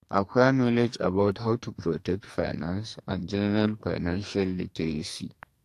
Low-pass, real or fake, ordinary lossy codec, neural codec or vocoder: 14.4 kHz; fake; AAC, 64 kbps; codec, 44.1 kHz, 2.6 kbps, SNAC